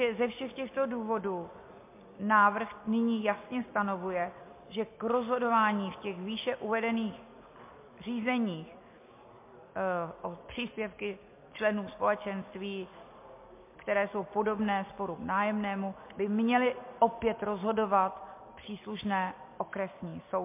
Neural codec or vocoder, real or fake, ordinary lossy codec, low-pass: none; real; MP3, 24 kbps; 3.6 kHz